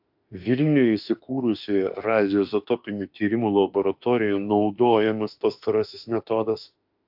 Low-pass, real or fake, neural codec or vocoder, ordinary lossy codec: 5.4 kHz; fake; autoencoder, 48 kHz, 32 numbers a frame, DAC-VAE, trained on Japanese speech; AAC, 48 kbps